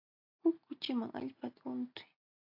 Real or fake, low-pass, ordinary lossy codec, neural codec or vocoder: real; 5.4 kHz; AAC, 32 kbps; none